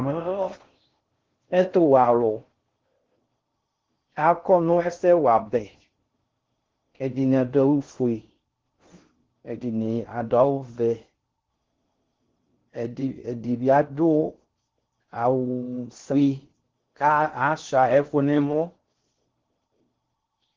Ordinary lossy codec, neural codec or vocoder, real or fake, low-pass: Opus, 16 kbps; codec, 16 kHz in and 24 kHz out, 0.6 kbps, FocalCodec, streaming, 4096 codes; fake; 7.2 kHz